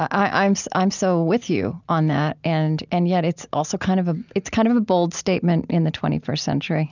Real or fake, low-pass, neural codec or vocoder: real; 7.2 kHz; none